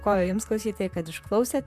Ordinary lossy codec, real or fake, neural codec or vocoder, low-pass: AAC, 96 kbps; fake; vocoder, 44.1 kHz, 128 mel bands, Pupu-Vocoder; 14.4 kHz